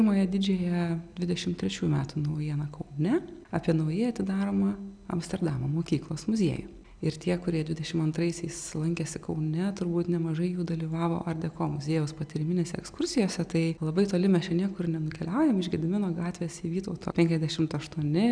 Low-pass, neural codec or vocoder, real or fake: 9.9 kHz; none; real